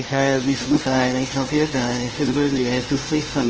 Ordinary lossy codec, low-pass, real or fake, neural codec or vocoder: Opus, 16 kbps; 7.2 kHz; fake; codec, 16 kHz, 0.5 kbps, FunCodec, trained on LibriTTS, 25 frames a second